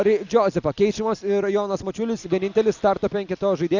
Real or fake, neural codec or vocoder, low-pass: real; none; 7.2 kHz